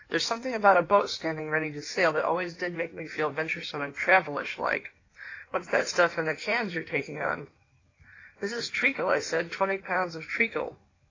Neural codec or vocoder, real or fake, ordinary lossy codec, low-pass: codec, 16 kHz in and 24 kHz out, 1.1 kbps, FireRedTTS-2 codec; fake; AAC, 32 kbps; 7.2 kHz